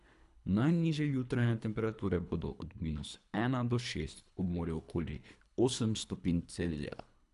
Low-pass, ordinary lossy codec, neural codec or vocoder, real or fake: 10.8 kHz; none; codec, 24 kHz, 3 kbps, HILCodec; fake